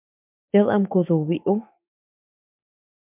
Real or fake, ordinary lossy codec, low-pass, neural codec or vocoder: fake; MP3, 32 kbps; 3.6 kHz; autoencoder, 48 kHz, 128 numbers a frame, DAC-VAE, trained on Japanese speech